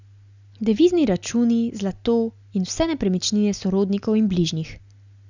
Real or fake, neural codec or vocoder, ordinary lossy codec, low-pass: real; none; none; 7.2 kHz